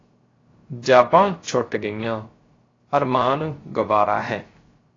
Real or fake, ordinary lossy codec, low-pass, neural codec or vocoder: fake; AAC, 32 kbps; 7.2 kHz; codec, 16 kHz, 0.3 kbps, FocalCodec